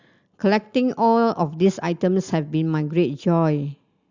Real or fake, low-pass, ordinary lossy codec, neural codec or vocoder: real; 7.2 kHz; Opus, 64 kbps; none